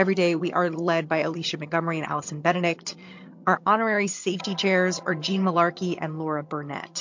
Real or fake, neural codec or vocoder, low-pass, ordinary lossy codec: fake; vocoder, 22.05 kHz, 80 mel bands, HiFi-GAN; 7.2 kHz; MP3, 48 kbps